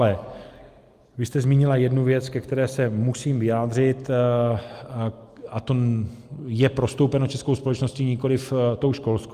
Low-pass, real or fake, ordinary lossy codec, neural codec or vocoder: 14.4 kHz; real; Opus, 32 kbps; none